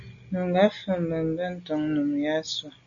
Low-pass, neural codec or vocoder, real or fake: 7.2 kHz; none; real